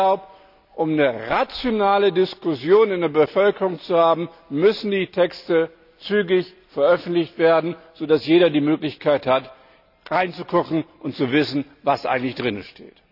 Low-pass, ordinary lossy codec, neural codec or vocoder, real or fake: 5.4 kHz; none; none; real